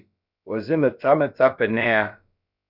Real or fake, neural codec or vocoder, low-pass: fake; codec, 16 kHz, about 1 kbps, DyCAST, with the encoder's durations; 5.4 kHz